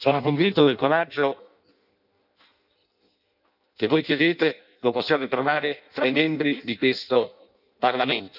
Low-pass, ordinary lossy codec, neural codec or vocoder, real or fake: 5.4 kHz; none; codec, 16 kHz in and 24 kHz out, 0.6 kbps, FireRedTTS-2 codec; fake